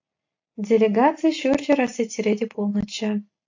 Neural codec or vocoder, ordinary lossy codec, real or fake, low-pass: none; AAC, 48 kbps; real; 7.2 kHz